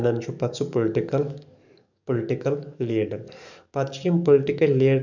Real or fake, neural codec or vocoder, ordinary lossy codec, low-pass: fake; codec, 44.1 kHz, 7.8 kbps, DAC; none; 7.2 kHz